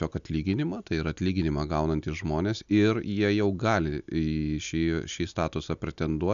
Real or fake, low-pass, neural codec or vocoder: real; 7.2 kHz; none